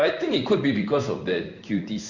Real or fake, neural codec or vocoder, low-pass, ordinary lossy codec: fake; codec, 16 kHz in and 24 kHz out, 1 kbps, XY-Tokenizer; 7.2 kHz; Opus, 64 kbps